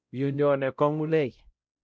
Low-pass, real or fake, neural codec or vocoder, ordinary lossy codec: none; fake; codec, 16 kHz, 0.5 kbps, X-Codec, WavLM features, trained on Multilingual LibriSpeech; none